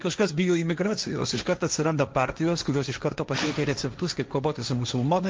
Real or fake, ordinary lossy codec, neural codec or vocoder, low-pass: fake; Opus, 24 kbps; codec, 16 kHz, 1.1 kbps, Voila-Tokenizer; 7.2 kHz